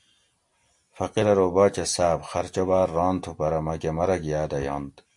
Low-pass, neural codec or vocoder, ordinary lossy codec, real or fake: 10.8 kHz; none; MP3, 64 kbps; real